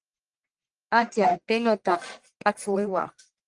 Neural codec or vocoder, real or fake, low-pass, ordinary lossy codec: codec, 44.1 kHz, 1.7 kbps, Pupu-Codec; fake; 10.8 kHz; Opus, 24 kbps